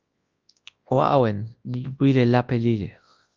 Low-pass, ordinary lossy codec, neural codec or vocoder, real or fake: 7.2 kHz; Opus, 32 kbps; codec, 24 kHz, 0.9 kbps, WavTokenizer, large speech release; fake